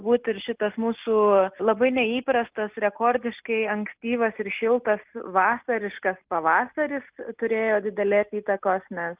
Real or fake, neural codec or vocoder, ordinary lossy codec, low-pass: real; none; Opus, 32 kbps; 3.6 kHz